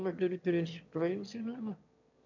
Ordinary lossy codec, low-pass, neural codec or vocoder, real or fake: none; 7.2 kHz; autoencoder, 22.05 kHz, a latent of 192 numbers a frame, VITS, trained on one speaker; fake